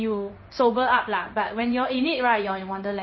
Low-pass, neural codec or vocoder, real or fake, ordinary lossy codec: 7.2 kHz; codec, 16 kHz in and 24 kHz out, 1 kbps, XY-Tokenizer; fake; MP3, 24 kbps